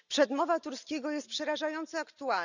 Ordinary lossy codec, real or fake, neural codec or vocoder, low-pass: none; real; none; 7.2 kHz